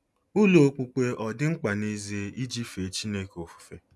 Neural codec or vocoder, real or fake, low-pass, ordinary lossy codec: none; real; none; none